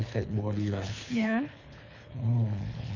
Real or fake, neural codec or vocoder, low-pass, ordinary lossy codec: fake; codec, 24 kHz, 3 kbps, HILCodec; 7.2 kHz; none